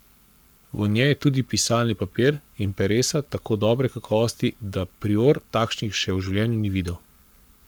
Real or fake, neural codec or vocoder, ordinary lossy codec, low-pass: fake; codec, 44.1 kHz, 7.8 kbps, Pupu-Codec; none; none